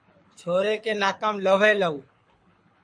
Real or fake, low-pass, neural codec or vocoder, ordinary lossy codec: fake; 9.9 kHz; codec, 24 kHz, 6 kbps, HILCodec; MP3, 48 kbps